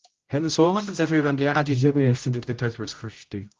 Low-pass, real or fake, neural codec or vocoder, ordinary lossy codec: 7.2 kHz; fake; codec, 16 kHz, 0.5 kbps, X-Codec, HuBERT features, trained on general audio; Opus, 32 kbps